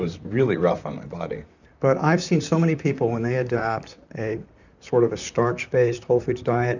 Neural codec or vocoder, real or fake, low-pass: vocoder, 44.1 kHz, 128 mel bands, Pupu-Vocoder; fake; 7.2 kHz